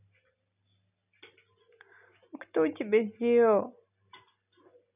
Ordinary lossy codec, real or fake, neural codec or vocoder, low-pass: none; real; none; 3.6 kHz